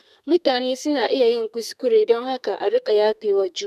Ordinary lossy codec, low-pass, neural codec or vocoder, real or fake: none; 14.4 kHz; codec, 32 kHz, 1.9 kbps, SNAC; fake